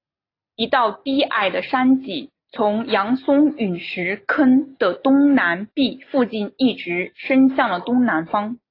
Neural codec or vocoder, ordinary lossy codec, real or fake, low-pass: none; AAC, 32 kbps; real; 5.4 kHz